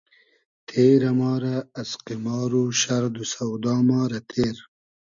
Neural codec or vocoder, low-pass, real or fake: none; 7.2 kHz; real